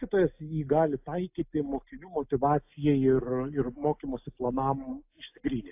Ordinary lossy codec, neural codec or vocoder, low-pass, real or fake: AAC, 32 kbps; none; 3.6 kHz; real